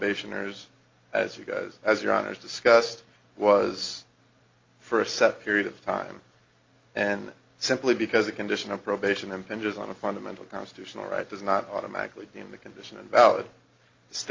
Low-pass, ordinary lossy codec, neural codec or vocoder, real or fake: 7.2 kHz; Opus, 32 kbps; none; real